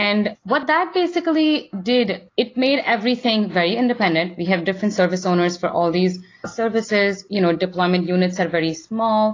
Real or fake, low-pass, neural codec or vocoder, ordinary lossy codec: real; 7.2 kHz; none; AAC, 32 kbps